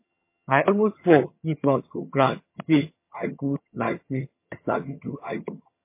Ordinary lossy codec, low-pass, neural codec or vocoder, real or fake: MP3, 24 kbps; 3.6 kHz; vocoder, 22.05 kHz, 80 mel bands, HiFi-GAN; fake